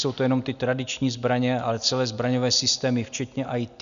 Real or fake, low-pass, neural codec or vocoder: real; 7.2 kHz; none